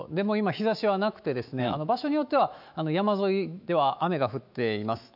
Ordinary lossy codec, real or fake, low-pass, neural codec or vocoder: none; fake; 5.4 kHz; autoencoder, 48 kHz, 128 numbers a frame, DAC-VAE, trained on Japanese speech